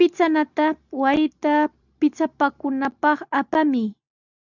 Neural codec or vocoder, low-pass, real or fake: none; 7.2 kHz; real